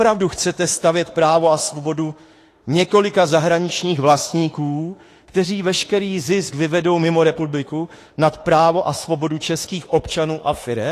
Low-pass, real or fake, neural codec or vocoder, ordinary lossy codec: 14.4 kHz; fake; autoencoder, 48 kHz, 32 numbers a frame, DAC-VAE, trained on Japanese speech; AAC, 48 kbps